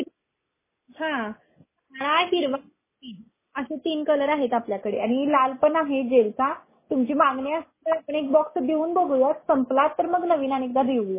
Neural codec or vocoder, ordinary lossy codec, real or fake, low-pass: none; MP3, 16 kbps; real; 3.6 kHz